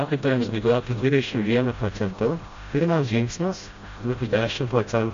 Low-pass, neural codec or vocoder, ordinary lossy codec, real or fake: 7.2 kHz; codec, 16 kHz, 0.5 kbps, FreqCodec, smaller model; MP3, 48 kbps; fake